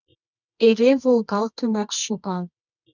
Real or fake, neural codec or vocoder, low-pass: fake; codec, 24 kHz, 0.9 kbps, WavTokenizer, medium music audio release; 7.2 kHz